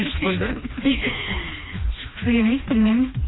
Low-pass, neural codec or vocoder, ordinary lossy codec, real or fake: 7.2 kHz; codec, 16 kHz, 2 kbps, FreqCodec, smaller model; AAC, 16 kbps; fake